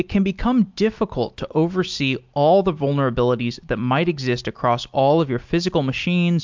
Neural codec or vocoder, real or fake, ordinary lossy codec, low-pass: none; real; MP3, 64 kbps; 7.2 kHz